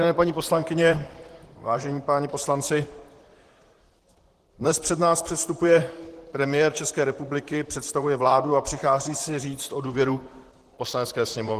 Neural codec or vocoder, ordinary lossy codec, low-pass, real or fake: vocoder, 44.1 kHz, 128 mel bands, Pupu-Vocoder; Opus, 16 kbps; 14.4 kHz; fake